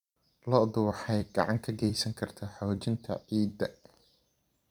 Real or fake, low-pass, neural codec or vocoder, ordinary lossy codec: fake; 19.8 kHz; vocoder, 44.1 kHz, 128 mel bands every 256 samples, BigVGAN v2; none